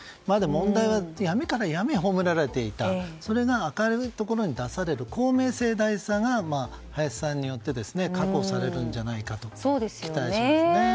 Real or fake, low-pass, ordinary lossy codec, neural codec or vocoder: real; none; none; none